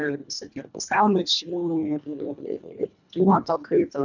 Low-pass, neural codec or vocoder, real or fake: 7.2 kHz; codec, 24 kHz, 1.5 kbps, HILCodec; fake